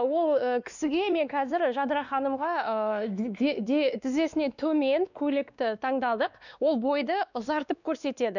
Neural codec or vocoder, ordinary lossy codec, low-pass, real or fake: codec, 16 kHz, 2 kbps, X-Codec, WavLM features, trained on Multilingual LibriSpeech; none; 7.2 kHz; fake